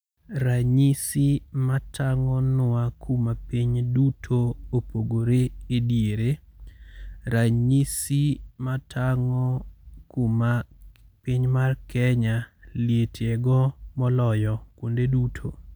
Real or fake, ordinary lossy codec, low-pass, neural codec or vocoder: real; none; none; none